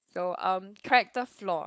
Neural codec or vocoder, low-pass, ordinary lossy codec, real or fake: codec, 16 kHz, 4.8 kbps, FACodec; none; none; fake